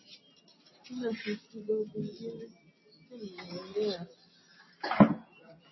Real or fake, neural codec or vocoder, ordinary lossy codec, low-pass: real; none; MP3, 24 kbps; 7.2 kHz